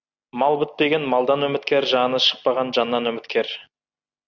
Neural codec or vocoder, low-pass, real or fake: none; 7.2 kHz; real